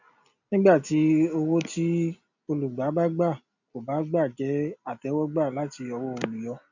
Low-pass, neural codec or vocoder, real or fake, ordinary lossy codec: 7.2 kHz; none; real; none